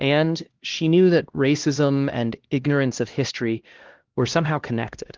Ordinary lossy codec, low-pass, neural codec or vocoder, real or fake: Opus, 16 kbps; 7.2 kHz; codec, 16 kHz, 1 kbps, X-Codec, HuBERT features, trained on LibriSpeech; fake